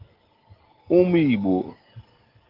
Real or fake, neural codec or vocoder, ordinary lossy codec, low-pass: real; none; Opus, 16 kbps; 5.4 kHz